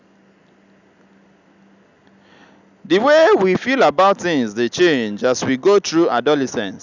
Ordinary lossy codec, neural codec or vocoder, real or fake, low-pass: none; none; real; 7.2 kHz